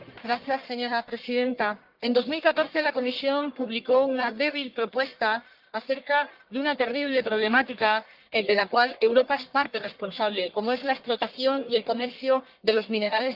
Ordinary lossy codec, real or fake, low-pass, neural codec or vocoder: Opus, 32 kbps; fake; 5.4 kHz; codec, 44.1 kHz, 1.7 kbps, Pupu-Codec